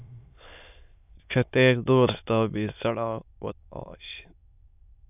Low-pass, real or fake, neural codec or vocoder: 3.6 kHz; fake; autoencoder, 22.05 kHz, a latent of 192 numbers a frame, VITS, trained on many speakers